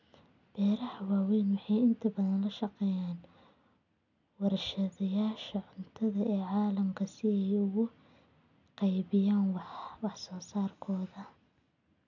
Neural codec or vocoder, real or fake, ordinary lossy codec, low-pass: none; real; none; 7.2 kHz